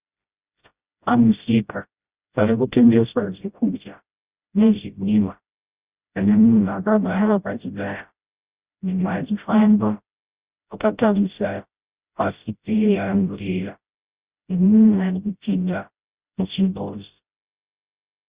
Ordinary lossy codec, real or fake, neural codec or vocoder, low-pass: Opus, 64 kbps; fake; codec, 16 kHz, 0.5 kbps, FreqCodec, smaller model; 3.6 kHz